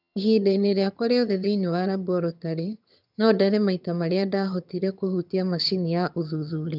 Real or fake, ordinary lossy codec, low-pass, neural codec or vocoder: fake; none; 5.4 kHz; vocoder, 22.05 kHz, 80 mel bands, HiFi-GAN